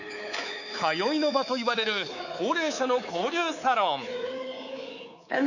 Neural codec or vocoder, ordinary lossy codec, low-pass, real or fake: codec, 24 kHz, 3.1 kbps, DualCodec; none; 7.2 kHz; fake